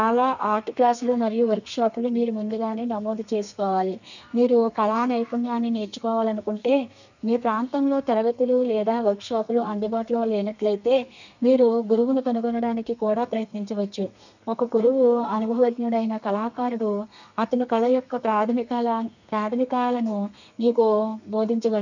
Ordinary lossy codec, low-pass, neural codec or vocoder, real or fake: none; 7.2 kHz; codec, 32 kHz, 1.9 kbps, SNAC; fake